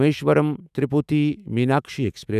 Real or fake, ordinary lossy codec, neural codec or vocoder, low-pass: fake; none; autoencoder, 48 kHz, 128 numbers a frame, DAC-VAE, trained on Japanese speech; 14.4 kHz